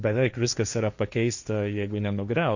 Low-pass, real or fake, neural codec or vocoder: 7.2 kHz; fake; codec, 16 kHz, 1.1 kbps, Voila-Tokenizer